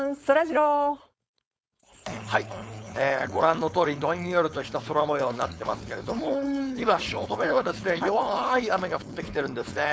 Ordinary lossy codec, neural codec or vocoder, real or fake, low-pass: none; codec, 16 kHz, 4.8 kbps, FACodec; fake; none